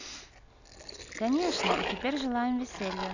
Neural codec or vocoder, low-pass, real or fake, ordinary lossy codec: none; 7.2 kHz; real; none